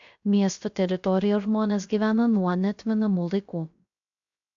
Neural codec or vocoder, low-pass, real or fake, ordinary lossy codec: codec, 16 kHz, 0.3 kbps, FocalCodec; 7.2 kHz; fake; AAC, 64 kbps